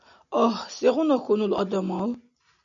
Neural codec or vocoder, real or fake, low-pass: none; real; 7.2 kHz